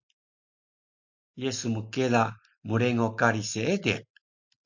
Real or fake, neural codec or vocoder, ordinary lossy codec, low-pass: real; none; MP3, 48 kbps; 7.2 kHz